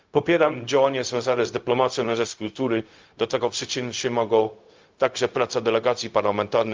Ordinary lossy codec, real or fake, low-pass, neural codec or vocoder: Opus, 24 kbps; fake; 7.2 kHz; codec, 16 kHz, 0.4 kbps, LongCat-Audio-Codec